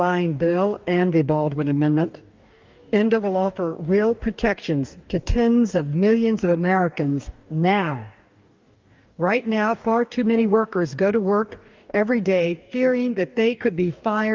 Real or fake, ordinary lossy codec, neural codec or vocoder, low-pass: fake; Opus, 24 kbps; codec, 44.1 kHz, 2.6 kbps, DAC; 7.2 kHz